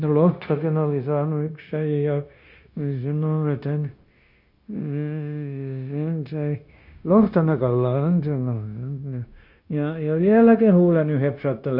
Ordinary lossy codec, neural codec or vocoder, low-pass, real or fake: none; codec, 16 kHz, 0.9 kbps, LongCat-Audio-Codec; 5.4 kHz; fake